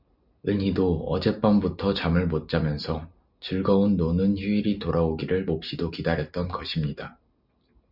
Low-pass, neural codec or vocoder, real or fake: 5.4 kHz; none; real